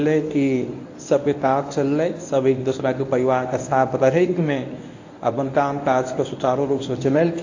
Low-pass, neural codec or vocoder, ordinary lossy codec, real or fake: 7.2 kHz; codec, 24 kHz, 0.9 kbps, WavTokenizer, medium speech release version 1; AAC, 48 kbps; fake